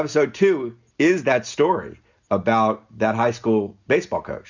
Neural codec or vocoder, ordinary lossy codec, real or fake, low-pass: none; Opus, 64 kbps; real; 7.2 kHz